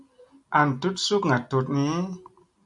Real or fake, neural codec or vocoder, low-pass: real; none; 10.8 kHz